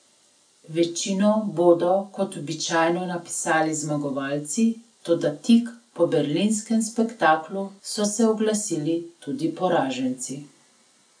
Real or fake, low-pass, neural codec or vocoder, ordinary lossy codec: real; 9.9 kHz; none; none